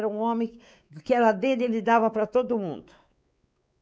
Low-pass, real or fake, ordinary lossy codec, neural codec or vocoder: none; fake; none; codec, 16 kHz, 4 kbps, X-Codec, WavLM features, trained on Multilingual LibriSpeech